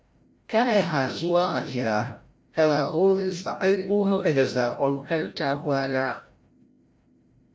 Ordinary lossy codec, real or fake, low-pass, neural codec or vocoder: none; fake; none; codec, 16 kHz, 0.5 kbps, FreqCodec, larger model